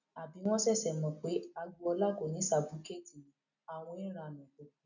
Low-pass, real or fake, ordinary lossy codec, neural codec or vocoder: 7.2 kHz; real; none; none